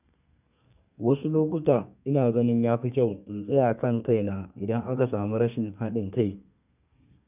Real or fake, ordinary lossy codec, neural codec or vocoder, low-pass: fake; none; codec, 32 kHz, 1.9 kbps, SNAC; 3.6 kHz